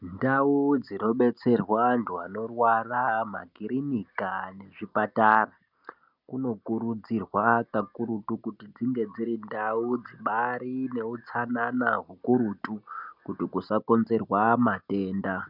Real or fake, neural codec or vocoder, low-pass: real; none; 5.4 kHz